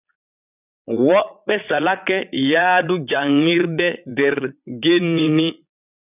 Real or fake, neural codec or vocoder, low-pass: fake; vocoder, 22.05 kHz, 80 mel bands, Vocos; 3.6 kHz